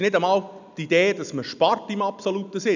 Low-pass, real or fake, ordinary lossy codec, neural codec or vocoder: 7.2 kHz; real; none; none